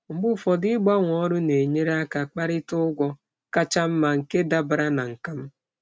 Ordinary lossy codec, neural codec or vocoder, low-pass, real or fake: none; none; none; real